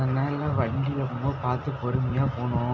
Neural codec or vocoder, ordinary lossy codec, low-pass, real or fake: none; none; 7.2 kHz; real